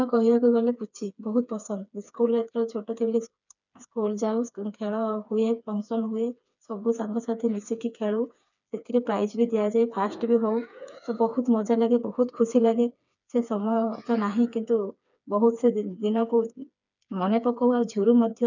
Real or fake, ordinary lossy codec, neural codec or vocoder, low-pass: fake; none; codec, 16 kHz, 4 kbps, FreqCodec, smaller model; 7.2 kHz